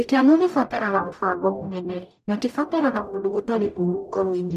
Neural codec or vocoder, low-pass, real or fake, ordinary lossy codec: codec, 44.1 kHz, 0.9 kbps, DAC; 14.4 kHz; fake; none